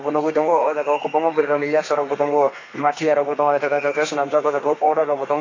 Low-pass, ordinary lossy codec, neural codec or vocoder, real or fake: 7.2 kHz; AAC, 32 kbps; codec, 32 kHz, 1.9 kbps, SNAC; fake